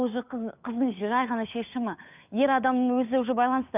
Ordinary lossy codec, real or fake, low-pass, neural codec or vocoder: none; fake; 3.6 kHz; codec, 16 kHz, 8 kbps, FunCodec, trained on Chinese and English, 25 frames a second